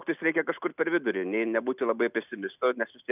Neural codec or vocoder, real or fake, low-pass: none; real; 3.6 kHz